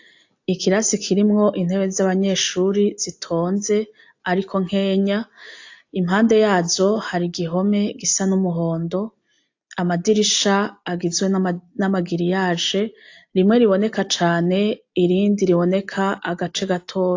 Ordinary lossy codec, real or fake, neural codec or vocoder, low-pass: AAC, 48 kbps; real; none; 7.2 kHz